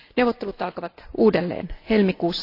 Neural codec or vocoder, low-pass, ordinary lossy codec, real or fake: none; 5.4 kHz; AAC, 32 kbps; real